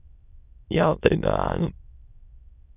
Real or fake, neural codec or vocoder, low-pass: fake; autoencoder, 22.05 kHz, a latent of 192 numbers a frame, VITS, trained on many speakers; 3.6 kHz